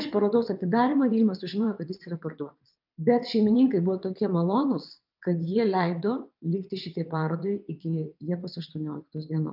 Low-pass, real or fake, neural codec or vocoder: 5.4 kHz; fake; vocoder, 22.05 kHz, 80 mel bands, WaveNeXt